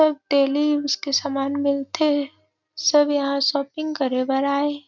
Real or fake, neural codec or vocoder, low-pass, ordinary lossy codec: real; none; 7.2 kHz; none